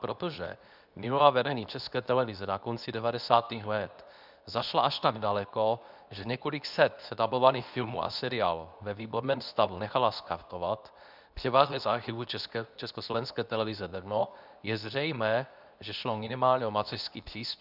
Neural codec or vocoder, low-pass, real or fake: codec, 24 kHz, 0.9 kbps, WavTokenizer, medium speech release version 2; 5.4 kHz; fake